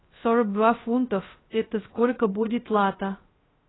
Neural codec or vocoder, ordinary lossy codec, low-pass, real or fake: codec, 16 kHz, 0.2 kbps, FocalCodec; AAC, 16 kbps; 7.2 kHz; fake